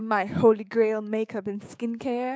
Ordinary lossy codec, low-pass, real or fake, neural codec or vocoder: none; none; fake; codec, 16 kHz, 6 kbps, DAC